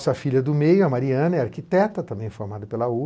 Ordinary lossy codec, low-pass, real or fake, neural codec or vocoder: none; none; real; none